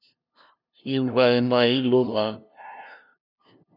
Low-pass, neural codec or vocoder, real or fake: 5.4 kHz; codec, 16 kHz, 0.5 kbps, FunCodec, trained on LibriTTS, 25 frames a second; fake